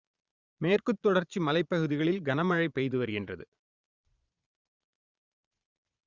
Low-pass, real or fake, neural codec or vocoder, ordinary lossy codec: 7.2 kHz; fake; vocoder, 22.05 kHz, 80 mel bands, Vocos; Opus, 64 kbps